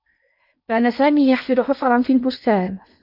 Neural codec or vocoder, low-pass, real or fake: codec, 16 kHz in and 24 kHz out, 0.8 kbps, FocalCodec, streaming, 65536 codes; 5.4 kHz; fake